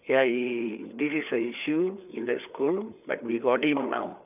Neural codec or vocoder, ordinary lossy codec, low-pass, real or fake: codec, 16 kHz, 4 kbps, FreqCodec, larger model; none; 3.6 kHz; fake